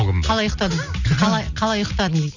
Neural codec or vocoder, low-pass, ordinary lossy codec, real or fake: none; 7.2 kHz; none; real